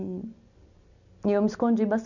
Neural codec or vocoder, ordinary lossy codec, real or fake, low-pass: none; none; real; 7.2 kHz